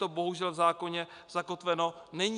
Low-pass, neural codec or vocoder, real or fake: 9.9 kHz; none; real